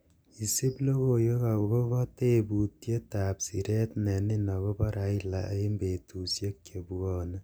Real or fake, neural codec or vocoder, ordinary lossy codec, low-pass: real; none; none; none